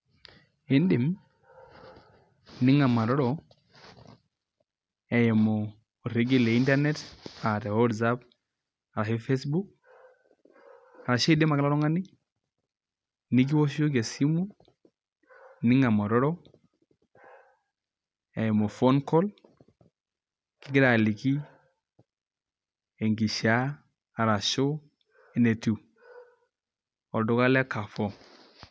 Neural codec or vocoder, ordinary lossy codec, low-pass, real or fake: none; none; none; real